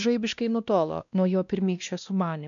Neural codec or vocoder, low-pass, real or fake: codec, 16 kHz, 1 kbps, X-Codec, WavLM features, trained on Multilingual LibriSpeech; 7.2 kHz; fake